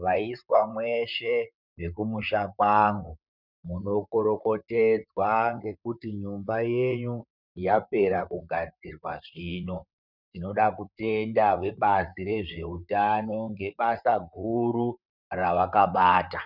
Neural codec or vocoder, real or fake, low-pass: vocoder, 44.1 kHz, 128 mel bands, Pupu-Vocoder; fake; 5.4 kHz